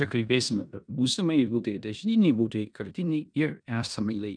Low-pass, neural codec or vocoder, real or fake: 9.9 kHz; codec, 16 kHz in and 24 kHz out, 0.9 kbps, LongCat-Audio-Codec, four codebook decoder; fake